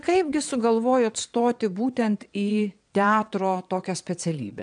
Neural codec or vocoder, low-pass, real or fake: vocoder, 22.05 kHz, 80 mel bands, Vocos; 9.9 kHz; fake